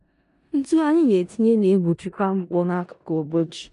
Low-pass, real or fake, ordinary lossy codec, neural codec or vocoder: 10.8 kHz; fake; none; codec, 16 kHz in and 24 kHz out, 0.4 kbps, LongCat-Audio-Codec, four codebook decoder